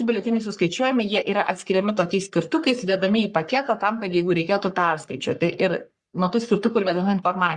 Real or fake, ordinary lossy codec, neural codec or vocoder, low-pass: fake; Opus, 64 kbps; codec, 44.1 kHz, 3.4 kbps, Pupu-Codec; 10.8 kHz